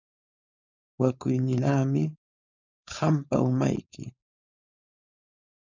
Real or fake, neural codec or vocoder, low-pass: fake; codec, 16 kHz, 4.8 kbps, FACodec; 7.2 kHz